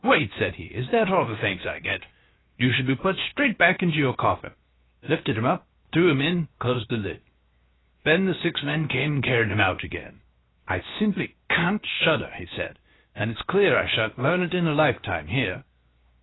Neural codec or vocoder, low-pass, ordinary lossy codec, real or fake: codec, 16 kHz, 0.7 kbps, FocalCodec; 7.2 kHz; AAC, 16 kbps; fake